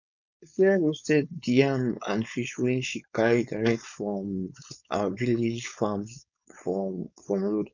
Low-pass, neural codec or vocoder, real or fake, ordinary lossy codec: 7.2 kHz; codec, 16 kHz, 4.8 kbps, FACodec; fake; none